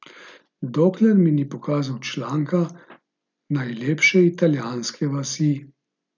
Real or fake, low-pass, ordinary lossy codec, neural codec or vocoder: real; 7.2 kHz; none; none